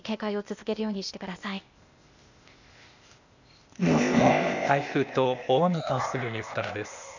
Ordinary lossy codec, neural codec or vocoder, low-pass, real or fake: none; codec, 16 kHz, 0.8 kbps, ZipCodec; 7.2 kHz; fake